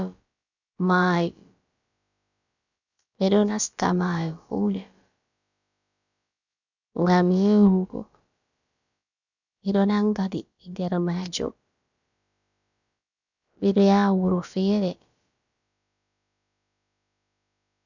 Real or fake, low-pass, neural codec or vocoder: fake; 7.2 kHz; codec, 16 kHz, about 1 kbps, DyCAST, with the encoder's durations